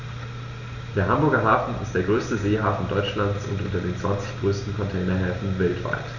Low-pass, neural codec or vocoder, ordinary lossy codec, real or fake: 7.2 kHz; none; none; real